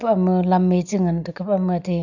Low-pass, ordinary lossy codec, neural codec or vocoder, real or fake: 7.2 kHz; none; none; real